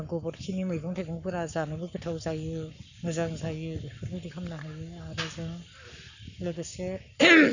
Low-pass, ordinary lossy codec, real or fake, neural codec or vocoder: 7.2 kHz; none; fake; codec, 44.1 kHz, 7.8 kbps, Pupu-Codec